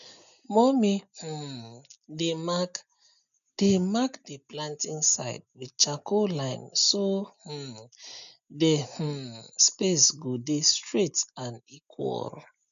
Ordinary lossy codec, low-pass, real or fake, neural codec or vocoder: none; 7.2 kHz; real; none